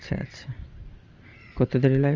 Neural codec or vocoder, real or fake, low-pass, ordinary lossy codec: vocoder, 44.1 kHz, 128 mel bands every 512 samples, BigVGAN v2; fake; 7.2 kHz; Opus, 32 kbps